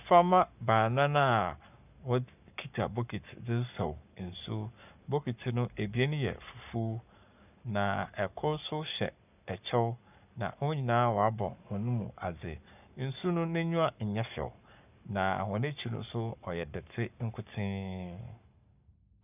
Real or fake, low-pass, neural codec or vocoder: fake; 3.6 kHz; codec, 44.1 kHz, 7.8 kbps, Pupu-Codec